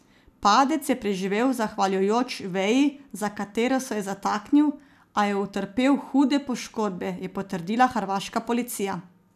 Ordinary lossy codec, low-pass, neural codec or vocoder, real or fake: none; 14.4 kHz; none; real